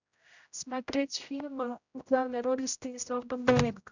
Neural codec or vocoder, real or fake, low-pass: codec, 16 kHz, 0.5 kbps, X-Codec, HuBERT features, trained on general audio; fake; 7.2 kHz